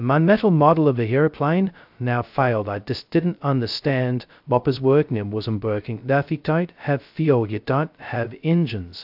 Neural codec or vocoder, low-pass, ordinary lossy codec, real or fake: codec, 16 kHz, 0.2 kbps, FocalCodec; 5.4 kHz; AAC, 48 kbps; fake